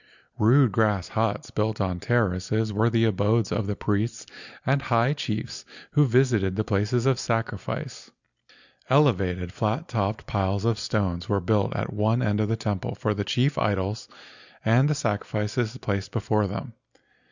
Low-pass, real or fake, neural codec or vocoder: 7.2 kHz; real; none